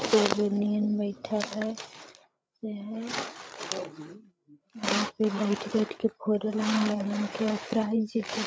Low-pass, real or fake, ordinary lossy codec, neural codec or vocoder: none; fake; none; codec, 16 kHz, 8 kbps, FreqCodec, larger model